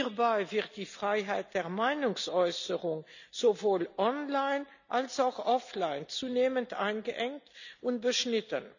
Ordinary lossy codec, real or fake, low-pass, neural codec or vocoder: none; real; 7.2 kHz; none